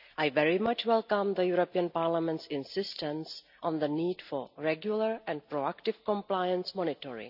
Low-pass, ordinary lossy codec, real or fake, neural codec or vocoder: 5.4 kHz; none; real; none